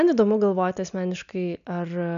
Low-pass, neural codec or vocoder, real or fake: 7.2 kHz; none; real